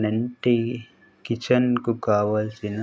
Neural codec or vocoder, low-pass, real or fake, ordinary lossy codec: none; none; real; none